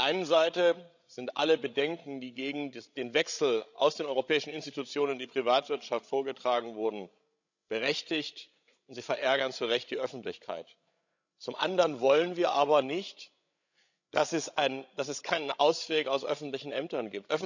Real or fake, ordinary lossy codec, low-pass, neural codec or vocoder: fake; none; 7.2 kHz; codec, 16 kHz, 16 kbps, FreqCodec, larger model